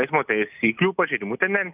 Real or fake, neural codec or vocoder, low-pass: real; none; 3.6 kHz